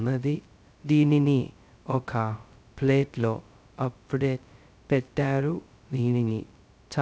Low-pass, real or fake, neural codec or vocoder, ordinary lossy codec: none; fake; codec, 16 kHz, 0.2 kbps, FocalCodec; none